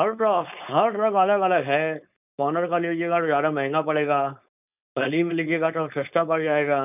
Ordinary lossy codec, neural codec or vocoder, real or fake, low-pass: none; codec, 16 kHz, 4.8 kbps, FACodec; fake; 3.6 kHz